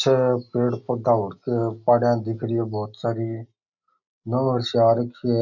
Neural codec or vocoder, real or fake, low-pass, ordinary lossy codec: none; real; 7.2 kHz; none